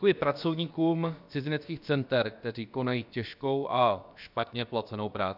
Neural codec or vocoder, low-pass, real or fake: codec, 16 kHz, about 1 kbps, DyCAST, with the encoder's durations; 5.4 kHz; fake